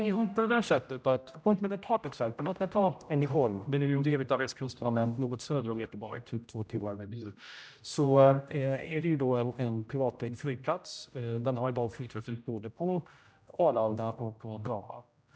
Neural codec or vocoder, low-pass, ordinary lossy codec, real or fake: codec, 16 kHz, 0.5 kbps, X-Codec, HuBERT features, trained on general audio; none; none; fake